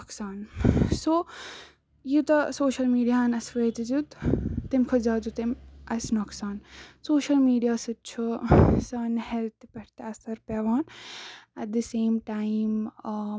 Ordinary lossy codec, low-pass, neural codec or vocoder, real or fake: none; none; none; real